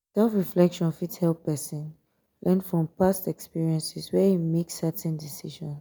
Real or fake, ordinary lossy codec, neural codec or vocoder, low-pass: real; none; none; none